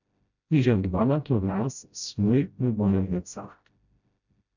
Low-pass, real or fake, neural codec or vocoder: 7.2 kHz; fake; codec, 16 kHz, 0.5 kbps, FreqCodec, smaller model